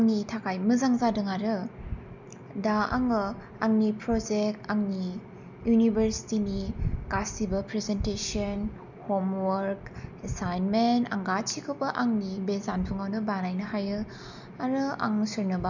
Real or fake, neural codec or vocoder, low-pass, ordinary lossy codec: real; none; 7.2 kHz; none